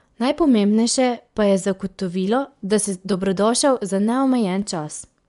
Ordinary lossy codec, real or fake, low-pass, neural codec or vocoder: none; fake; 10.8 kHz; vocoder, 24 kHz, 100 mel bands, Vocos